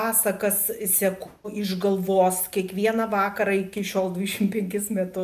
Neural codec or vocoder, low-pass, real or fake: none; 14.4 kHz; real